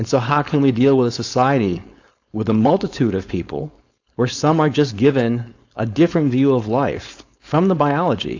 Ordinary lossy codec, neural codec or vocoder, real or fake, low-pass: AAC, 48 kbps; codec, 16 kHz, 4.8 kbps, FACodec; fake; 7.2 kHz